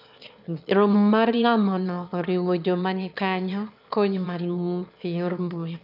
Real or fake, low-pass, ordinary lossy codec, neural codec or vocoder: fake; 5.4 kHz; none; autoencoder, 22.05 kHz, a latent of 192 numbers a frame, VITS, trained on one speaker